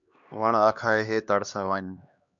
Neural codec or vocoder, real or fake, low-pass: codec, 16 kHz, 2 kbps, X-Codec, HuBERT features, trained on LibriSpeech; fake; 7.2 kHz